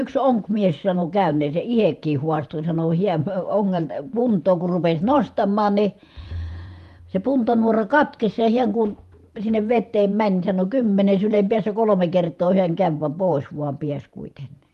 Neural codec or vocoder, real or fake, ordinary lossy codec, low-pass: vocoder, 48 kHz, 128 mel bands, Vocos; fake; Opus, 32 kbps; 14.4 kHz